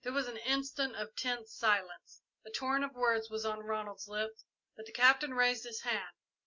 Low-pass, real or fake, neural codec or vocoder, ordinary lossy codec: 7.2 kHz; real; none; MP3, 64 kbps